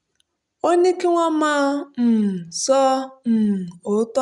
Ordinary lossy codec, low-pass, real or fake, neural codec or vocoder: none; 10.8 kHz; real; none